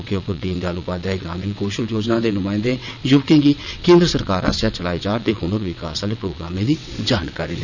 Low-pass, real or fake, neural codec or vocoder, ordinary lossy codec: 7.2 kHz; fake; vocoder, 22.05 kHz, 80 mel bands, WaveNeXt; none